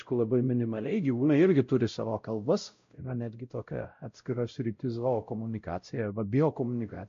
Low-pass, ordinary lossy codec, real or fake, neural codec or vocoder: 7.2 kHz; MP3, 48 kbps; fake; codec, 16 kHz, 0.5 kbps, X-Codec, WavLM features, trained on Multilingual LibriSpeech